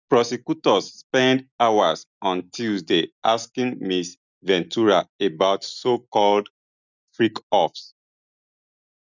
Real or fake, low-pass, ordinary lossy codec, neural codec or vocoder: real; 7.2 kHz; none; none